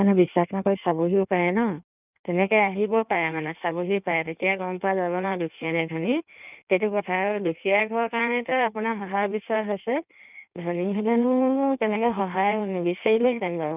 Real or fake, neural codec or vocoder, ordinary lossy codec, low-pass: fake; codec, 16 kHz in and 24 kHz out, 1.1 kbps, FireRedTTS-2 codec; none; 3.6 kHz